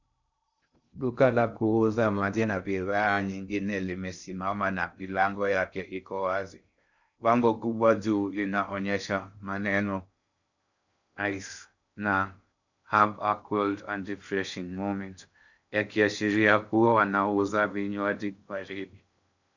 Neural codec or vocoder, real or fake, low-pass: codec, 16 kHz in and 24 kHz out, 0.6 kbps, FocalCodec, streaming, 2048 codes; fake; 7.2 kHz